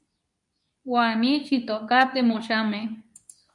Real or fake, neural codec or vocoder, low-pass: fake; codec, 24 kHz, 0.9 kbps, WavTokenizer, medium speech release version 2; 10.8 kHz